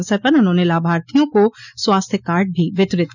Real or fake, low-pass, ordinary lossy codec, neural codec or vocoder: real; 7.2 kHz; none; none